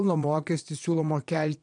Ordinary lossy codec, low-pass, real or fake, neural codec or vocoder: MP3, 64 kbps; 9.9 kHz; fake; vocoder, 22.05 kHz, 80 mel bands, Vocos